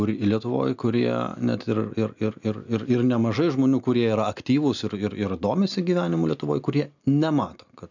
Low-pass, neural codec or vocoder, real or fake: 7.2 kHz; none; real